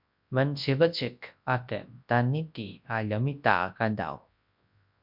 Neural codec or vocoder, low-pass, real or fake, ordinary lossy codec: codec, 24 kHz, 0.9 kbps, WavTokenizer, large speech release; 5.4 kHz; fake; MP3, 48 kbps